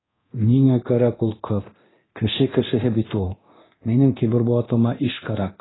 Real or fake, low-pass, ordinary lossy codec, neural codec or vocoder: fake; 7.2 kHz; AAC, 16 kbps; codec, 24 kHz, 0.9 kbps, DualCodec